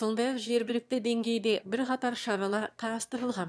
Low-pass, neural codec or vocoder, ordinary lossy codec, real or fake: none; autoencoder, 22.05 kHz, a latent of 192 numbers a frame, VITS, trained on one speaker; none; fake